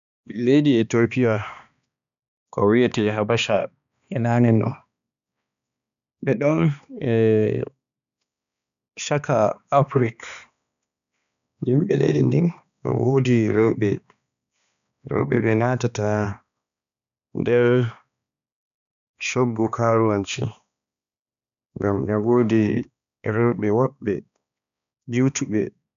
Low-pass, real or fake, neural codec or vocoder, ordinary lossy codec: 7.2 kHz; fake; codec, 16 kHz, 2 kbps, X-Codec, HuBERT features, trained on balanced general audio; none